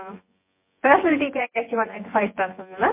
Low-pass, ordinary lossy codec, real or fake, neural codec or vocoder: 3.6 kHz; MP3, 24 kbps; fake; vocoder, 24 kHz, 100 mel bands, Vocos